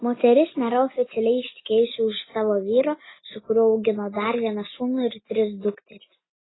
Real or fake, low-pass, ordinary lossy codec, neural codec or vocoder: real; 7.2 kHz; AAC, 16 kbps; none